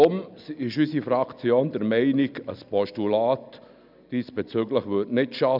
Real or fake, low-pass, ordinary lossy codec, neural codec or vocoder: real; 5.4 kHz; none; none